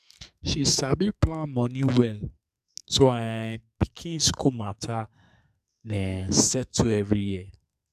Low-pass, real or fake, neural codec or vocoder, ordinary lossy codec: 14.4 kHz; fake; codec, 44.1 kHz, 2.6 kbps, SNAC; none